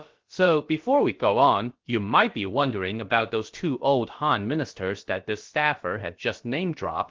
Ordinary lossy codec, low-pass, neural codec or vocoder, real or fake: Opus, 16 kbps; 7.2 kHz; codec, 16 kHz, about 1 kbps, DyCAST, with the encoder's durations; fake